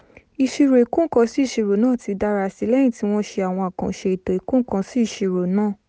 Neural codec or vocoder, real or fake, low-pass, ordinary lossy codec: none; real; none; none